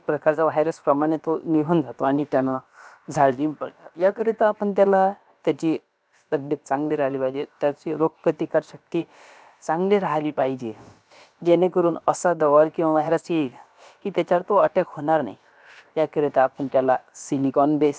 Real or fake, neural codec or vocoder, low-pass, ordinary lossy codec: fake; codec, 16 kHz, about 1 kbps, DyCAST, with the encoder's durations; none; none